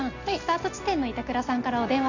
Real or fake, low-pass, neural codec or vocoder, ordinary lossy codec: real; 7.2 kHz; none; none